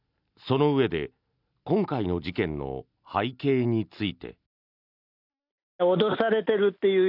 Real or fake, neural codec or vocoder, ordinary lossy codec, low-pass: real; none; none; 5.4 kHz